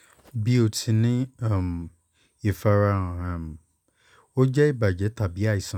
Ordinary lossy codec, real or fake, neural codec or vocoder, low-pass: none; real; none; 19.8 kHz